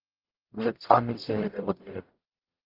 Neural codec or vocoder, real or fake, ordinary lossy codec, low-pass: codec, 44.1 kHz, 0.9 kbps, DAC; fake; Opus, 24 kbps; 5.4 kHz